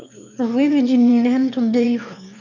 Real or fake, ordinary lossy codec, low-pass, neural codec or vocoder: fake; MP3, 64 kbps; 7.2 kHz; autoencoder, 22.05 kHz, a latent of 192 numbers a frame, VITS, trained on one speaker